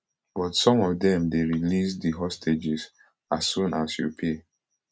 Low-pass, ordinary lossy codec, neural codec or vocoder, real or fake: none; none; none; real